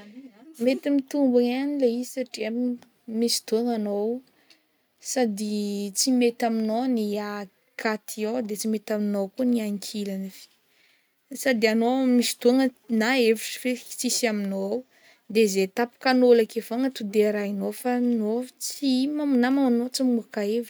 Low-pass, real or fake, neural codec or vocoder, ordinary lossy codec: none; real; none; none